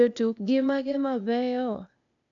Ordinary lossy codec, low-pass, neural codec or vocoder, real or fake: none; 7.2 kHz; codec, 16 kHz, 0.8 kbps, ZipCodec; fake